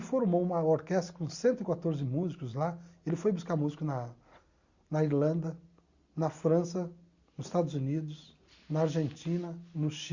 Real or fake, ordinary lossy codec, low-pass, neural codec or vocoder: real; Opus, 64 kbps; 7.2 kHz; none